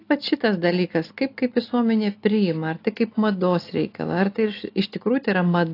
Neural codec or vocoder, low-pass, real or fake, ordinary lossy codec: none; 5.4 kHz; real; AAC, 32 kbps